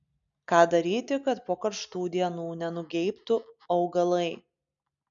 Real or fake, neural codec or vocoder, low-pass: real; none; 7.2 kHz